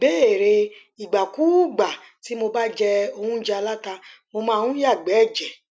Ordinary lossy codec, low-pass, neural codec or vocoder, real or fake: none; none; none; real